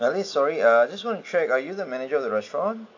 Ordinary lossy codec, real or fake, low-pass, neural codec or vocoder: AAC, 48 kbps; real; 7.2 kHz; none